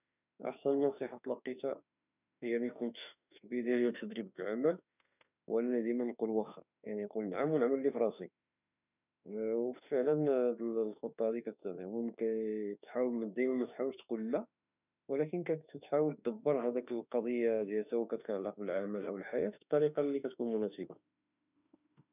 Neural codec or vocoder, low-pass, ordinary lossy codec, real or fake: autoencoder, 48 kHz, 32 numbers a frame, DAC-VAE, trained on Japanese speech; 3.6 kHz; none; fake